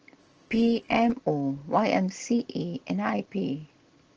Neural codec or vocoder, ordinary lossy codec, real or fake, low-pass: none; Opus, 16 kbps; real; 7.2 kHz